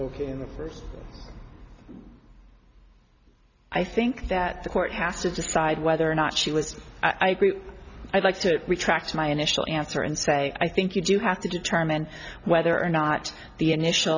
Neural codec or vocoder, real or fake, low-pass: none; real; 7.2 kHz